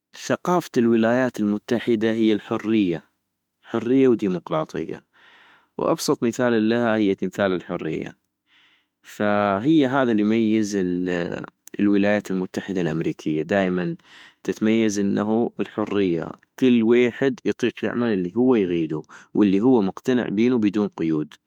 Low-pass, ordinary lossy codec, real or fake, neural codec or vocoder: 19.8 kHz; MP3, 96 kbps; fake; autoencoder, 48 kHz, 32 numbers a frame, DAC-VAE, trained on Japanese speech